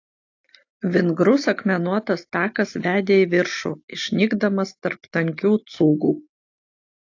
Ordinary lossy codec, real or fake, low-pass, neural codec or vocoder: AAC, 48 kbps; real; 7.2 kHz; none